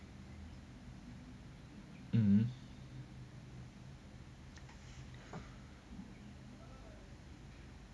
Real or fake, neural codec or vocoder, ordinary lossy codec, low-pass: real; none; none; none